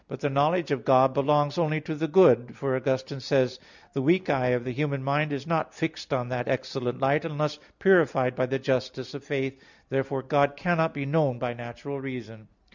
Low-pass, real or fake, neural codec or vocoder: 7.2 kHz; real; none